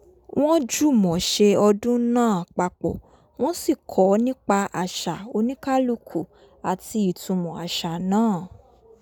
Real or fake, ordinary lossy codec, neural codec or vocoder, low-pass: real; none; none; 19.8 kHz